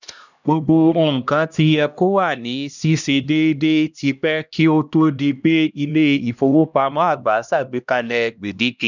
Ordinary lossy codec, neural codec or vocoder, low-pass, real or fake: none; codec, 16 kHz, 1 kbps, X-Codec, HuBERT features, trained on LibriSpeech; 7.2 kHz; fake